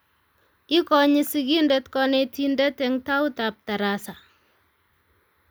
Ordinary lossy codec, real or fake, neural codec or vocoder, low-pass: none; real; none; none